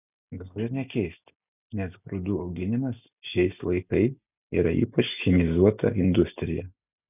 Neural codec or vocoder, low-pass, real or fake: none; 3.6 kHz; real